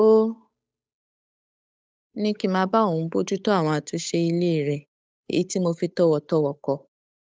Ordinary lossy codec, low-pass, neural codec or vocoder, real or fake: none; none; codec, 16 kHz, 8 kbps, FunCodec, trained on Chinese and English, 25 frames a second; fake